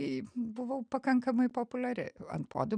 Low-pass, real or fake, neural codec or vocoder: 9.9 kHz; real; none